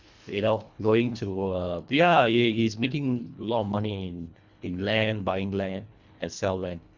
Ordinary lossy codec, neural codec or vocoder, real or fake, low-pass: Opus, 64 kbps; codec, 24 kHz, 1.5 kbps, HILCodec; fake; 7.2 kHz